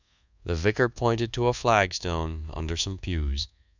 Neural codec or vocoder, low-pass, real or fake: codec, 24 kHz, 1.2 kbps, DualCodec; 7.2 kHz; fake